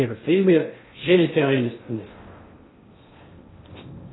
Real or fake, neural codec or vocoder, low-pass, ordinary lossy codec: fake; codec, 16 kHz in and 24 kHz out, 0.6 kbps, FocalCodec, streaming, 2048 codes; 7.2 kHz; AAC, 16 kbps